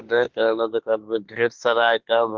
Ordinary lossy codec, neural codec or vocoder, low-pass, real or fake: Opus, 16 kbps; autoencoder, 48 kHz, 32 numbers a frame, DAC-VAE, trained on Japanese speech; 7.2 kHz; fake